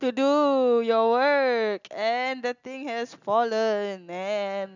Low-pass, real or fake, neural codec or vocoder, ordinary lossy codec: 7.2 kHz; real; none; none